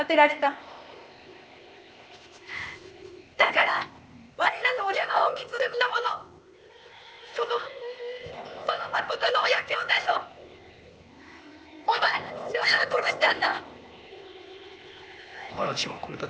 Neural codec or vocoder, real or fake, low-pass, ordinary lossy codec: codec, 16 kHz, 0.8 kbps, ZipCodec; fake; none; none